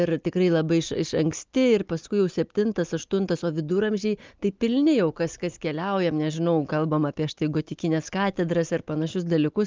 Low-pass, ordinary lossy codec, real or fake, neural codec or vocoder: 7.2 kHz; Opus, 24 kbps; real; none